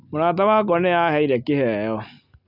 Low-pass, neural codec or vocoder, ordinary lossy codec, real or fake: 5.4 kHz; none; none; real